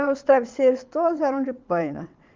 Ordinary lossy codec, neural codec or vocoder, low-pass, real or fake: Opus, 24 kbps; vocoder, 22.05 kHz, 80 mel bands, WaveNeXt; 7.2 kHz; fake